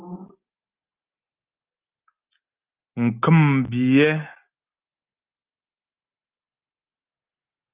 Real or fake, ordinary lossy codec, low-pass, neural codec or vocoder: real; Opus, 24 kbps; 3.6 kHz; none